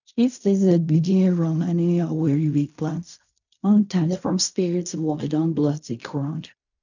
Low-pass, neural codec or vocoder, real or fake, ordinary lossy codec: 7.2 kHz; codec, 16 kHz in and 24 kHz out, 0.4 kbps, LongCat-Audio-Codec, fine tuned four codebook decoder; fake; none